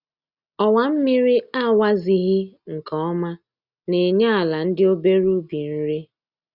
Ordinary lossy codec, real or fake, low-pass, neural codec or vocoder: Opus, 64 kbps; real; 5.4 kHz; none